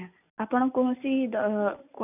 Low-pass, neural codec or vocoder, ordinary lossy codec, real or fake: 3.6 kHz; none; none; real